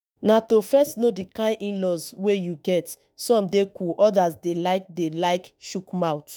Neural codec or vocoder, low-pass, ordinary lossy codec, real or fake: autoencoder, 48 kHz, 32 numbers a frame, DAC-VAE, trained on Japanese speech; none; none; fake